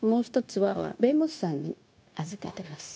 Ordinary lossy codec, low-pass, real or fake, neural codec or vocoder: none; none; fake; codec, 16 kHz, 0.9 kbps, LongCat-Audio-Codec